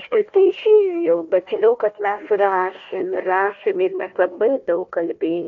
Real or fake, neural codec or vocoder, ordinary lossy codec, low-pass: fake; codec, 16 kHz, 1 kbps, FunCodec, trained on Chinese and English, 50 frames a second; MP3, 48 kbps; 7.2 kHz